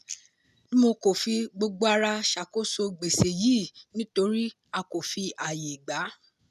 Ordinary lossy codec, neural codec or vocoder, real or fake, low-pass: none; none; real; 14.4 kHz